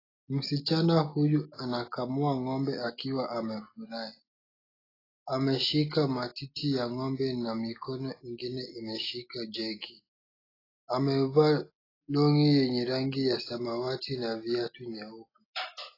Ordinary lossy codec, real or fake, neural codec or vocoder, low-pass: AAC, 24 kbps; real; none; 5.4 kHz